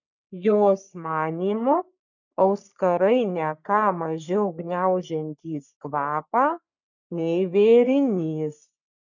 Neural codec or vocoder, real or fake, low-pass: codec, 44.1 kHz, 3.4 kbps, Pupu-Codec; fake; 7.2 kHz